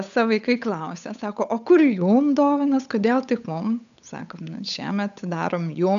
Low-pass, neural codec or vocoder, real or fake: 7.2 kHz; codec, 16 kHz, 8 kbps, FunCodec, trained on Chinese and English, 25 frames a second; fake